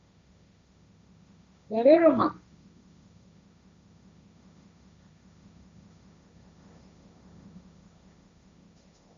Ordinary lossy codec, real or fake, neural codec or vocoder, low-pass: AAC, 64 kbps; fake; codec, 16 kHz, 1.1 kbps, Voila-Tokenizer; 7.2 kHz